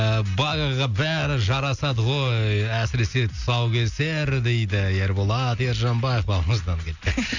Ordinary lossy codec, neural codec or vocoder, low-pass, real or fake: none; none; 7.2 kHz; real